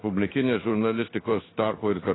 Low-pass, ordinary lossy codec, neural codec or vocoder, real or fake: 7.2 kHz; AAC, 16 kbps; codec, 16 kHz in and 24 kHz out, 1 kbps, XY-Tokenizer; fake